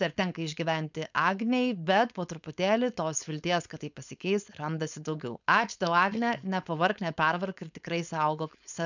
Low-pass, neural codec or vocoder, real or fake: 7.2 kHz; codec, 16 kHz, 4.8 kbps, FACodec; fake